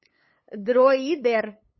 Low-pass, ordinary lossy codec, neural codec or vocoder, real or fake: 7.2 kHz; MP3, 24 kbps; codec, 16 kHz, 8 kbps, FunCodec, trained on LibriTTS, 25 frames a second; fake